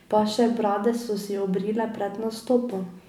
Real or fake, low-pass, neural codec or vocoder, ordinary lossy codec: real; 19.8 kHz; none; none